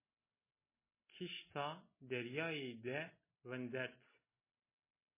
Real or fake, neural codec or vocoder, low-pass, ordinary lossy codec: real; none; 3.6 kHz; MP3, 16 kbps